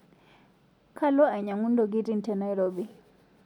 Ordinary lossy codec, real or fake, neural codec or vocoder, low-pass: none; real; none; 19.8 kHz